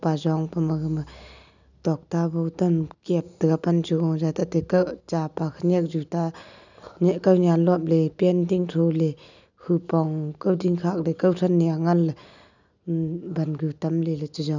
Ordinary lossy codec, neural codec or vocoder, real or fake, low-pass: none; none; real; 7.2 kHz